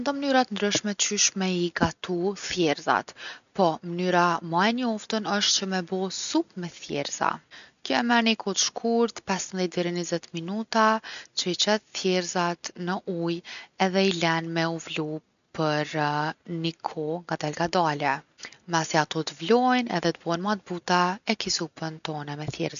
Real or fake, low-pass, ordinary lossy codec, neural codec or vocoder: real; 7.2 kHz; none; none